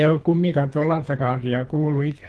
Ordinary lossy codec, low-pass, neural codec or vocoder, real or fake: Opus, 16 kbps; 10.8 kHz; codec, 24 kHz, 3 kbps, HILCodec; fake